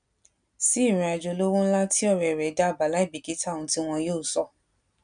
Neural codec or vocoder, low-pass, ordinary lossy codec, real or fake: none; 9.9 kHz; none; real